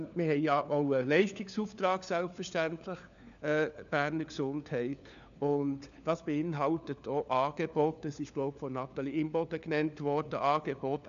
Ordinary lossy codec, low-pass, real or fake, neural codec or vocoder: none; 7.2 kHz; fake; codec, 16 kHz, 4 kbps, FunCodec, trained on LibriTTS, 50 frames a second